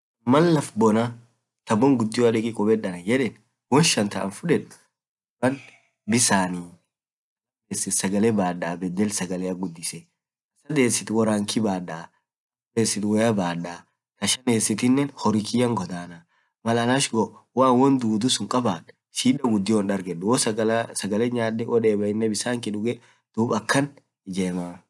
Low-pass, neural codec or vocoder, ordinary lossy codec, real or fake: none; none; none; real